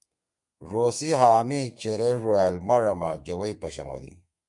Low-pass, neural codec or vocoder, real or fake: 10.8 kHz; codec, 32 kHz, 1.9 kbps, SNAC; fake